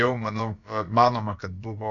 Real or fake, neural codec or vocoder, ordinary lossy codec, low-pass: fake; codec, 16 kHz, about 1 kbps, DyCAST, with the encoder's durations; MP3, 96 kbps; 7.2 kHz